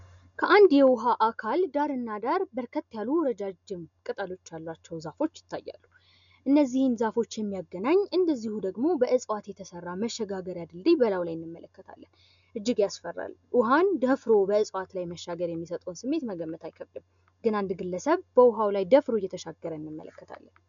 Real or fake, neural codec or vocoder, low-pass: real; none; 7.2 kHz